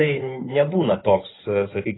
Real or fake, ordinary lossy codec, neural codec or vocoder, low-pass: fake; AAC, 16 kbps; codec, 16 kHz in and 24 kHz out, 2.2 kbps, FireRedTTS-2 codec; 7.2 kHz